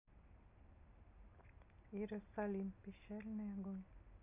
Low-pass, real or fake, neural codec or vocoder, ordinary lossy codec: 3.6 kHz; real; none; none